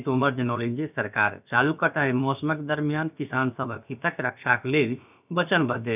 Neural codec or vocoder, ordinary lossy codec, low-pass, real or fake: codec, 16 kHz, about 1 kbps, DyCAST, with the encoder's durations; none; 3.6 kHz; fake